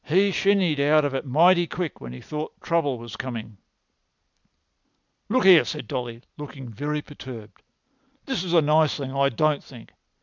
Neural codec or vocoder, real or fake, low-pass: none; real; 7.2 kHz